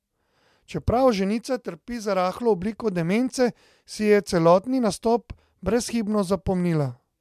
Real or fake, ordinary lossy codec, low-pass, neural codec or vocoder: real; MP3, 96 kbps; 14.4 kHz; none